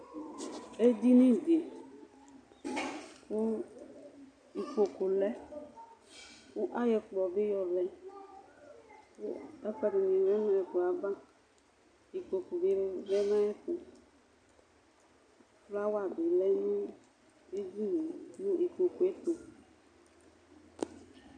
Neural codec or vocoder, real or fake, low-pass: none; real; 9.9 kHz